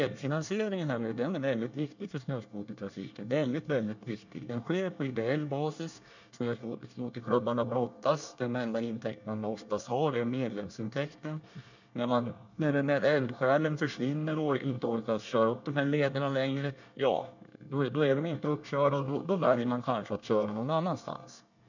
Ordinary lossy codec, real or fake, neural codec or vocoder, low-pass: none; fake; codec, 24 kHz, 1 kbps, SNAC; 7.2 kHz